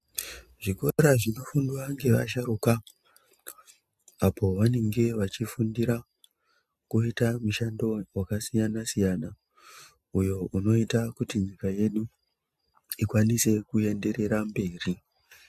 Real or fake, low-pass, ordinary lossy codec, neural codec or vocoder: real; 14.4 kHz; MP3, 96 kbps; none